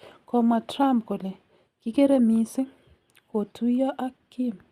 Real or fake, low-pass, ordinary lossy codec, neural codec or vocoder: real; 14.4 kHz; Opus, 64 kbps; none